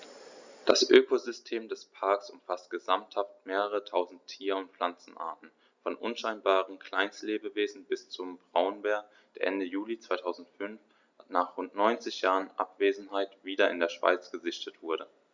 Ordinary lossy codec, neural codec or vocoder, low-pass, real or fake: Opus, 64 kbps; none; 7.2 kHz; real